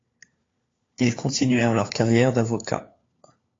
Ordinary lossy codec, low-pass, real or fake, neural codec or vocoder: AAC, 32 kbps; 7.2 kHz; fake; codec, 16 kHz, 4 kbps, FunCodec, trained on LibriTTS, 50 frames a second